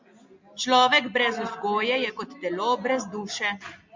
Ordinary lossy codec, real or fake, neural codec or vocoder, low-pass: MP3, 64 kbps; real; none; 7.2 kHz